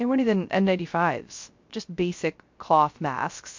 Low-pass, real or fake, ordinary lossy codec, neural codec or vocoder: 7.2 kHz; fake; MP3, 48 kbps; codec, 16 kHz, 0.3 kbps, FocalCodec